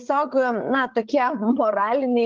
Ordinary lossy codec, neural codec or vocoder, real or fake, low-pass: Opus, 32 kbps; codec, 16 kHz, 8 kbps, FreqCodec, larger model; fake; 7.2 kHz